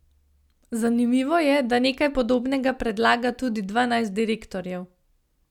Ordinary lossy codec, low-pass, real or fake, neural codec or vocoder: none; 19.8 kHz; real; none